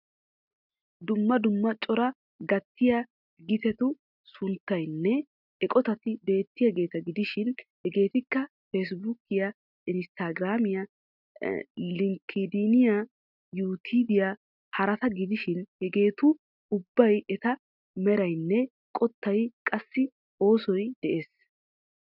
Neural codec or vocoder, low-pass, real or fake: none; 5.4 kHz; real